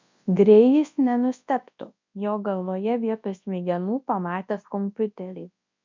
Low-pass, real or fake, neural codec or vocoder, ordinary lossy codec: 7.2 kHz; fake; codec, 24 kHz, 0.9 kbps, WavTokenizer, large speech release; MP3, 64 kbps